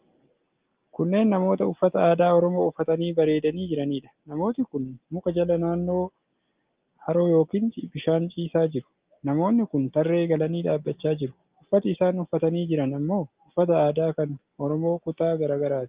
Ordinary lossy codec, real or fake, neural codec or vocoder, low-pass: Opus, 32 kbps; real; none; 3.6 kHz